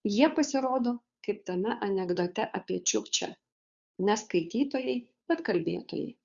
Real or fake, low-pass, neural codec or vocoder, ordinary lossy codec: fake; 7.2 kHz; codec, 16 kHz, 2 kbps, FunCodec, trained on Chinese and English, 25 frames a second; Opus, 64 kbps